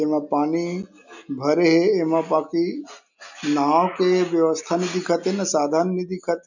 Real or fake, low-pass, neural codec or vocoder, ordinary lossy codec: real; 7.2 kHz; none; none